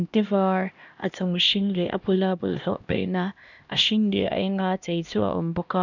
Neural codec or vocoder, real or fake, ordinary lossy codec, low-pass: codec, 16 kHz, 1 kbps, X-Codec, HuBERT features, trained on LibriSpeech; fake; none; 7.2 kHz